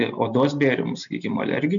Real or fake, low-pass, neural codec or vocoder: real; 7.2 kHz; none